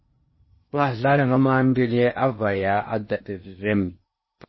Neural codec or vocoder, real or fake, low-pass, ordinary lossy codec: codec, 16 kHz in and 24 kHz out, 0.8 kbps, FocalCodec, streaming, 65536 codes; fake; 7.2 kHz; MP3, 24 kbps